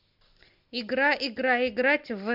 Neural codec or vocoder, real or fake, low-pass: none; real; 5.4 kHz